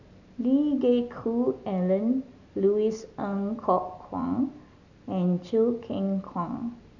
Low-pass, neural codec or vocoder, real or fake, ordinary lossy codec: 7.2 kHz; none; real; none